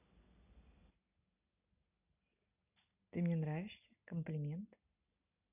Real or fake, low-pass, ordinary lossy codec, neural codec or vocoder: real; 3.6 kHz; none; none